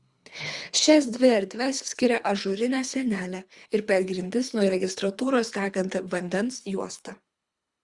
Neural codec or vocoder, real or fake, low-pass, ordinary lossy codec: codec, 24 kHz, 3 kbps, HILCodec; fake; 10.8 kHz; Opus, 64 kbps